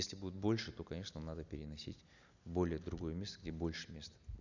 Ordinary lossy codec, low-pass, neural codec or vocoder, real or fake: none; 7.2 kHz; none; real